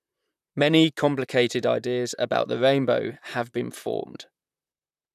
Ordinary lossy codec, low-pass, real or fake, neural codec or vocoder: none; 14.4 kHz; fake; vocoder, 44.1 kHz, 128 mel bands, Pupu-Vocoder